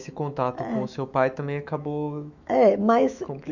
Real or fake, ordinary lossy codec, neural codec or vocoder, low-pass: fake; none; autoencoder, 48 kHz, 128 numbers a frame, DAC-VAE, trained on Japanese speech; 7.2 kHz